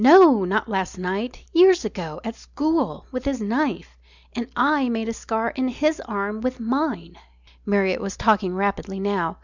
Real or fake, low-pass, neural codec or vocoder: real; 7.2 kHz; none